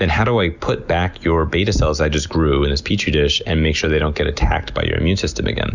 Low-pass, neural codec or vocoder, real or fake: 7.2 kHz; none; real